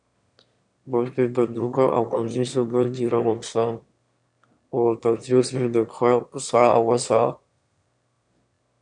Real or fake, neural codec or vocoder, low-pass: fake; autoencoder, 22.05 kHz, a latent of 192 numbers a frame, VITS, trained on one speaker; 9.9 kHz